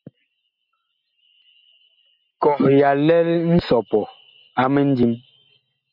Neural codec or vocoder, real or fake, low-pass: none; real; 5.4 kHz